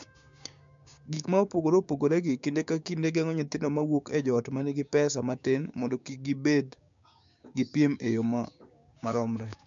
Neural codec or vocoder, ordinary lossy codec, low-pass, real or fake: codec, 16 kHz, 6 kbps, DAC; MP3, 64 kbps; 7.2 kHz; fake